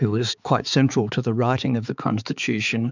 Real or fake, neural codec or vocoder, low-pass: fake; codec, 16 kHz, 4 kbps, X-Codec, HuBERT features, trained on balanced general audio; 7.2 kHz